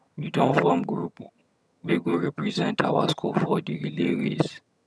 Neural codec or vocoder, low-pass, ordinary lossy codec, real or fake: vocoder, 22.05 kHz, 80 mel bands, HiFi-GAN; none; none; fake